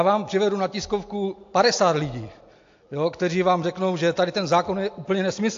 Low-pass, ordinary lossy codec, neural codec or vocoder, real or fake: 7.2 kHz; AAC, 48 kbps; none; real